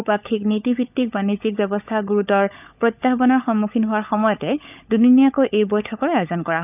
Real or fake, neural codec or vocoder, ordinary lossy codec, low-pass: fake; codec, 16 kHz, 16 kbps, FunCodec, trained on LibriTTS, 50 frames a second; none; 3.6 kHz